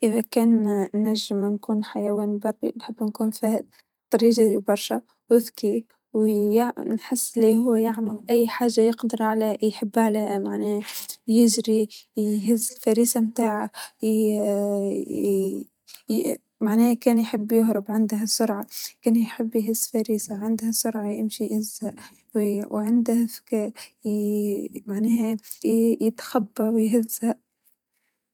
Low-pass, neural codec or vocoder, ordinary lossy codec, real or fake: 19.8 kHz; vocoder, 44.1 kHz, 128 mel bands every 512 samples, BigVGAN v2; none; fake